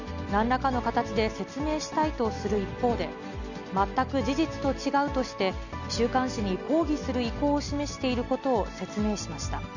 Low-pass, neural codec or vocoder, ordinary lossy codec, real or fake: 7.2 kHz; none; none; real